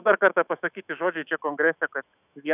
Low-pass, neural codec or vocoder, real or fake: 3.6 kHz; autoencoder, 48 kHz, 128 numbers a frame, DAC-VAE, trained on Japanese speech; fake